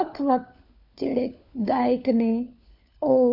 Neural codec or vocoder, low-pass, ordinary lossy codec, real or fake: codec, 16 kHz, 4 kbps, FunCodec, trained on LibriTTS, 50 frames a second; 5.4 kHz; none; fake